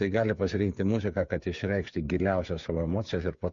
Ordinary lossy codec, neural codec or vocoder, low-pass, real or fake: MP3, 48 kbps; codec, 16 kHz, 8 kbps, FreqCodec, smaller model; 7.2 kHz; fake